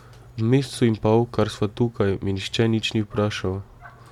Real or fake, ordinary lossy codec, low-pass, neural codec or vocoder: real; MP3, 96 kbps; 19.8 kHz; none